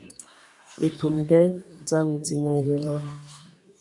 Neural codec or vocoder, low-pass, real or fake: codec, 24 kHz, 1 kbps, SNAC; 10.8 kHz; fake